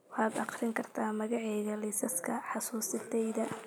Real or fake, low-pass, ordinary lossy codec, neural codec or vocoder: real; none; none; none